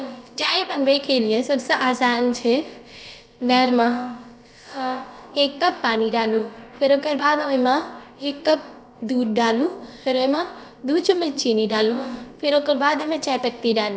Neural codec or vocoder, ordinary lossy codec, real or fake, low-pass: codec, 16 kHz, about 1 kbps, DyCAST, with the encoder's durations; none; fake; none